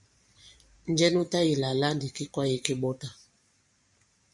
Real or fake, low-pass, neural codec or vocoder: fake; 10.8 kHz; vocoder, 44.1 kHz, 128 mel bands every 512 samples, BigVGAN v2